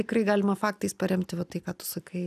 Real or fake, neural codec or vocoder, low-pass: real; none; 14.4 kHz